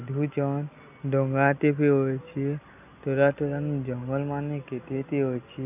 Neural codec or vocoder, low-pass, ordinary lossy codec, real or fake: none; 3.6 kHz; none; real